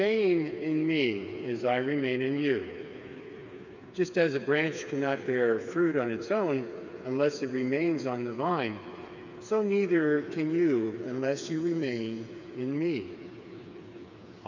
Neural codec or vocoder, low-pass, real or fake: codec, 16 kHz, 4 kbps, FreqCodec, smaller model; 7.2 kHz; fake